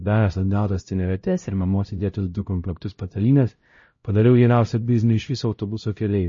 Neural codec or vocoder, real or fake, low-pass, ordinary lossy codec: codec, 16 kHz, 0.5 kbps, X-Codec, HuBERT features, trained on LibriSpeech; fake; 7.2 kHz; MP3, 32 kbps